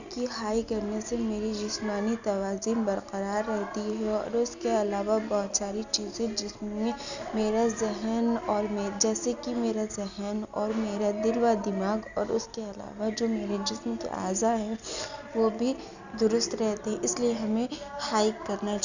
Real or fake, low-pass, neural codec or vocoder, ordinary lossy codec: real; 7.2 kHz; none; none